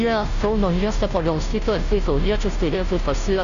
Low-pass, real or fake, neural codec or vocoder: 7.2 kHz; fake; codec, 16 kHz, 0.5 kbps, FunCodec, trained on Chinese and English, 25 frames a second